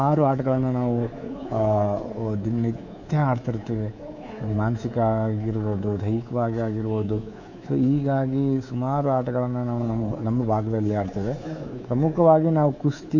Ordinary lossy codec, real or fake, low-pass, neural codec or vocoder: none; fake; 7.2 kHz; codec, 24 kHz, 3.1 kbps, DualCodec